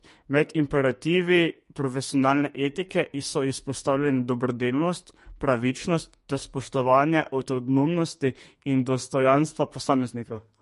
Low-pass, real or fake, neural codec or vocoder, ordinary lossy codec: 14.4 kHz; fake; codec, 44.1 kHz, 2.6 kbps, SNAC; MP3, 48 kbps